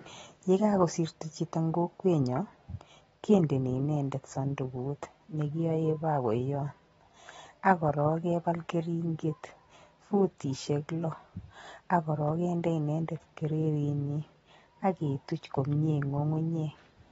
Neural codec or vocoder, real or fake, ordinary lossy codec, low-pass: none; real; AAC, 24 kbps; 10.8 kHz